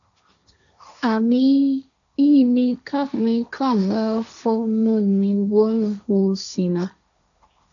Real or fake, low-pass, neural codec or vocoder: fake; 7.2 kHz; codec, 16 kHz, 1.1 kbps, Voila-Tokenizer